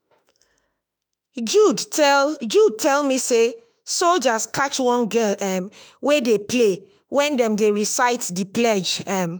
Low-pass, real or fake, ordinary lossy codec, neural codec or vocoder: none; fake; none; autoencoder, 48 kHz, 32 numbers a frame, DAC-VAE, trained on Japanese speech